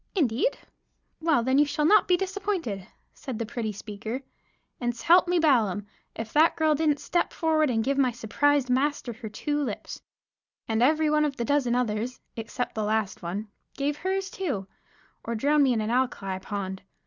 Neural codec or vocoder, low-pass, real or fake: none; 7.2 kHz; real